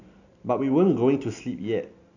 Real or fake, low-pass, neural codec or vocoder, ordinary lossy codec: real; 7.2 kHz; none; none